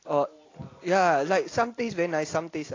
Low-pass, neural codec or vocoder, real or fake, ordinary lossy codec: 7.2 kHz; none; real; AAC, 32 kbps